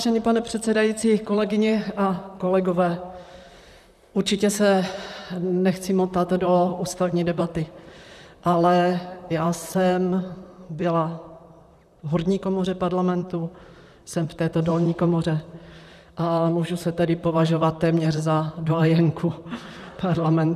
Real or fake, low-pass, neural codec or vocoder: fake; 14.4 kHz; vocoder, 44.1 kHz, 128 mel bands, Pupu-Vocoder